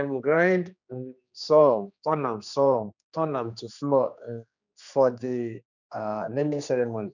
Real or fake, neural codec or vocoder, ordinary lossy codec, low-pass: fake; codec, 16 kHz, 2 kbps, X-Codec, HuBERT features, trained on general audio; none; 7.2 kHz